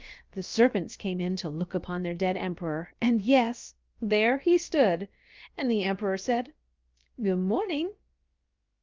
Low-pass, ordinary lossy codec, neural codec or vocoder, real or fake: 7.2 kHz; Opus, 24 kbps; codec, 16 kHz, about 1 kbps, DyCAST, with the encoder's durations; fake